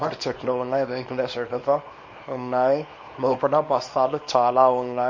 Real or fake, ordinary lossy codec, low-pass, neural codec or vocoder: fake; MP3, 32 kbps; 7.2 kHz; codec, 24 kHz, 0.9 kbps, WavTokenizer, small release